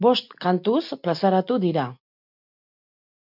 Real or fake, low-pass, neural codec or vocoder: real; 5.4 kHz; none